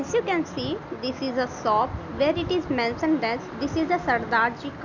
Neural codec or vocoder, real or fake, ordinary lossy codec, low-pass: none; real; none; 7.2 kHz